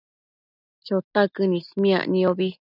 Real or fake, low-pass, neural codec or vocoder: real; 5.4 kHz; none